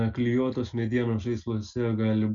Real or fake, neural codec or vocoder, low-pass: real; none; 7.2 kHz